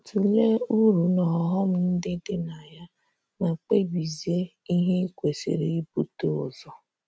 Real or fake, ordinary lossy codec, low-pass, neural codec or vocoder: real; none; none; none